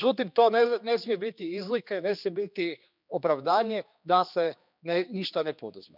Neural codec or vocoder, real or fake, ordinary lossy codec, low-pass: codec, 16 kHz, 2 kbps, X-Codec, HuBERT features, trained on general audio; fake; none; 5.4 kHz